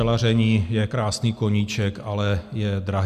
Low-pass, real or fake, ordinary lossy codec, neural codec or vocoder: 14.4 kHz; real; Opus, 64 kbps; none